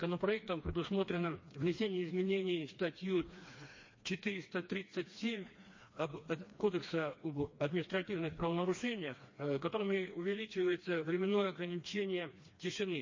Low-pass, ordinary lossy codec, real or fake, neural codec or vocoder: 7.2 kHz; MP3, 32 kbps; fake; codec, 16 kHz, 2 kbps, FreqCodec, smaller model